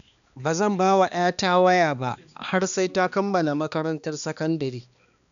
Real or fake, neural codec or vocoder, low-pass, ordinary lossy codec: fake; codec, 16 kHz, 2 kbps, X-Codec, HuBERT features, trained on balanced general audio; 7.2 kHz; none